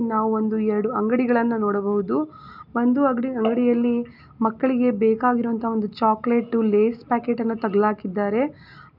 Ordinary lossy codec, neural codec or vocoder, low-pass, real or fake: none; none; 5.4 kHz; real